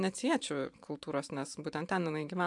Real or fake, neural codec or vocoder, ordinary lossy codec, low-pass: fake; vocoder, 44.1 kHz, 128 mel bands every 512 samples, BigVGAN v2; AAC, 64 kbps; 10.8 kHz